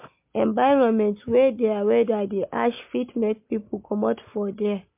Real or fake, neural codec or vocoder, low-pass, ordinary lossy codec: real; none; 3.6 kHz; MP3, 32 kbps